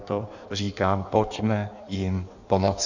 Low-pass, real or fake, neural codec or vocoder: 7.2 kHz; fake; codec, 16 kHz in and 24 kHz out, 1.1 kbps, FireRedTTS-2 codec